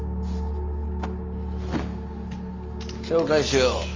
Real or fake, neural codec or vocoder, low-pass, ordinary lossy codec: real; none; 7.2 kHz; Opus, 32 kbps